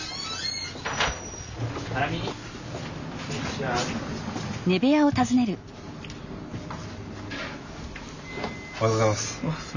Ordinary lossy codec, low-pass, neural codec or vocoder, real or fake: none; 7.2 kHz; none; real